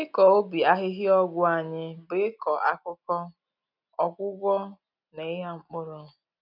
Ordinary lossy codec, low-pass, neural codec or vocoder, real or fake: none; 5.4 kHz; none; real